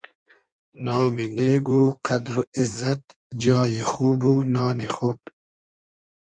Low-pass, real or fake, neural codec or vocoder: 9.9 kHz; fake; codec, 16 kHz in and 24 kHz out, 1.1 kbps, FireRedTTS-2 codec